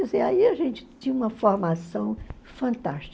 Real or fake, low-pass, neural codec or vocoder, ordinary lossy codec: real; none; none; none